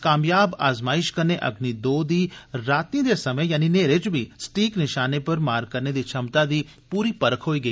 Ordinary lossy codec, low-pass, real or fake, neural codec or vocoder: none; none; real; none